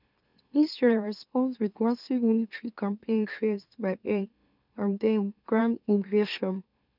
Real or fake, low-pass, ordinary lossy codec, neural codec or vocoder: fake; 5.4 kHz; none; autoencoder, 44.1 kHz, a latent of 192 numbers a frame, MeloTTS